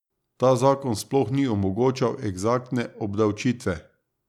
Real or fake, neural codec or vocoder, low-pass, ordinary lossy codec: real; none; 19.8 kHz; none